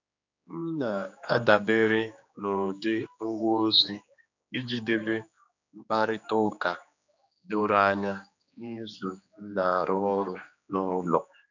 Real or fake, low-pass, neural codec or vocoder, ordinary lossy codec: fake; 7.2 kHz; codec, 16 kHz, 2 kbps, X-Codec, HuBERT features, trained on general audio; none